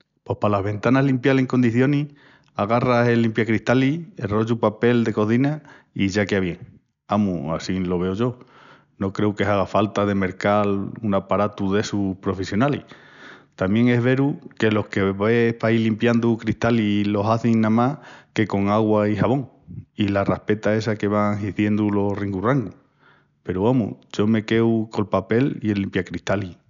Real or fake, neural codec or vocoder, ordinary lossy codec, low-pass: real; none; none; 7.2 kHz